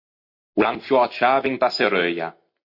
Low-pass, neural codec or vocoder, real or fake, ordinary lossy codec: 5.4 kHz; none; real; MP3, 32 kbps